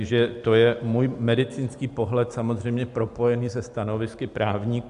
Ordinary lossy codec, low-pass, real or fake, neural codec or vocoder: AAC, 64 kbps; 10.8 kHz; real; none